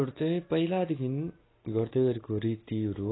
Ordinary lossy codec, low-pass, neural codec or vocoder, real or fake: AAC, 16 kbps; 7.2 kHz; none; real